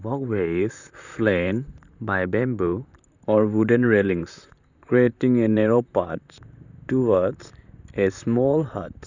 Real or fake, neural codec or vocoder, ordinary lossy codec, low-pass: fake; vocoder, 44.1 kHz, 128 mel bands, Pupu-Vocoder; none; 7.2 kHz